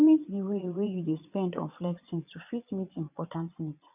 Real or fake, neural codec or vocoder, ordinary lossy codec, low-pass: fake; vocoder, 22.05 kHz, 80 mel bands, Vocos; none; 3.6 kHz